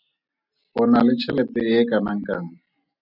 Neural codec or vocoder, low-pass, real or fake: none; 5.4 kHz; real